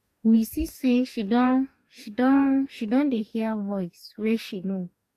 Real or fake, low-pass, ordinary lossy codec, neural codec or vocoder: fake; 14.4 kHz; AAC, 64 kbps; codec, 44.1 kHz, 2.6 kbps, DAC